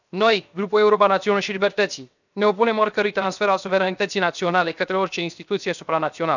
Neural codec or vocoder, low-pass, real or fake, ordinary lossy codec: codec, 16 kHz, 0.7 kbps, FocalCodec; 7.2 kHz; fake; none